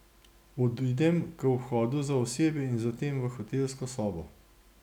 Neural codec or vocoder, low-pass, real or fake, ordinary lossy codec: none; 19.8 kHz; real; none